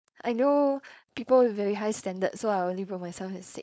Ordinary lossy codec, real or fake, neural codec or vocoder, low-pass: none; fake; codec, 16 kHz, 4.8 kbps, FACodec; none